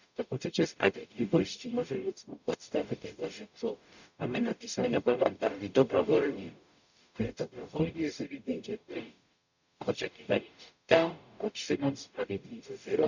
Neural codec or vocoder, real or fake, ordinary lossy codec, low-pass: codec, 44.1 kHz, 0.9 kbps, DAC; fake; none; 7.2 kHz